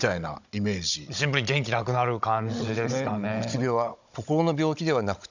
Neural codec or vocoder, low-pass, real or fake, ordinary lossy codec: codec, 16 kHz, 16 kbps, FunCodec, trained on Chinese and English, 50 frames a second; 7.2 kHz; fake; none